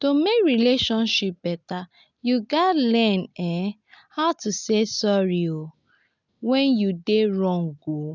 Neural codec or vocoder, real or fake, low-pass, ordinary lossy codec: none; real; 7.2 kHz; none